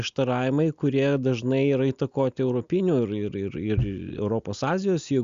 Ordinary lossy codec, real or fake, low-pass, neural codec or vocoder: Opus, 64 kbps; real; 7.2 kHz; none